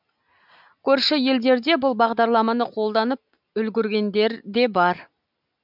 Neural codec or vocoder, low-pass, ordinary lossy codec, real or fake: none; 5.4 kHz; none; real